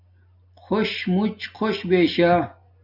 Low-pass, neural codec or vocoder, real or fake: 5.4 kHz; none; real